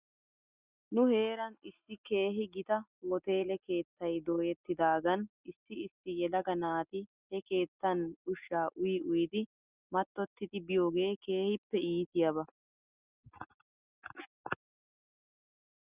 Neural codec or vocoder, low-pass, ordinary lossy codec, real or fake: none; 3.6 kHz; Opus, 64 kbps; real